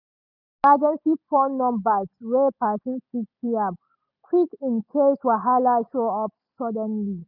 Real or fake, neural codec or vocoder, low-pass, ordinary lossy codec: real; none; 5.4 kHz; none